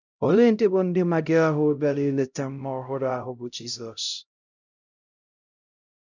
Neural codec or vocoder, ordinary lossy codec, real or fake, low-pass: codec, 16 kHz, 0.5 kbps, X-Codec, HuBERT features, trained on LibriSpeech; none; fake; 7.2 kHz